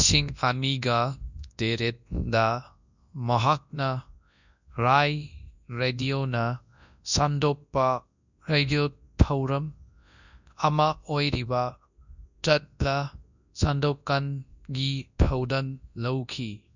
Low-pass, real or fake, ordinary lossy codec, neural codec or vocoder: 7.2 kHz; fake; none; codec, 24 kHz, 0.9 kbps, WavTokenizer, large speech release